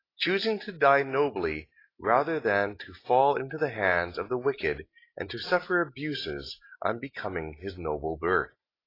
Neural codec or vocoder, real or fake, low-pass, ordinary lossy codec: none; real; 5.4 kHz; AAC, 24 kbps